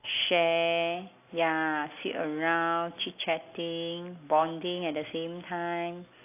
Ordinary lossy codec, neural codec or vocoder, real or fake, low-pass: AAC, 24 kbps; none; real; 3.6 kHz